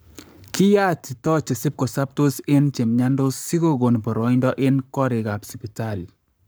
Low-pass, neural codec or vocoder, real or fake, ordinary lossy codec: none; codec, 44.1 kHz, 7.8 kbps, Pupu-Codec; fake; none